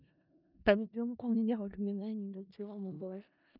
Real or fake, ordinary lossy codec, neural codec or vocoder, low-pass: fake; none; codec, 16 kHz in and 24 kHz out, 0.4 kbps, LongCat-Audio-Codec, four codebook decoder; 5.4 kHz